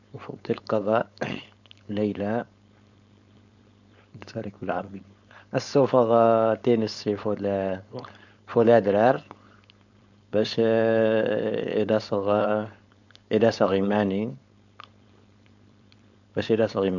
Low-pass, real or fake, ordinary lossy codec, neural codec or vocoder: 7.2 kHz; fake; none; codec, 16 kHz, 4.8 kbps, FACodec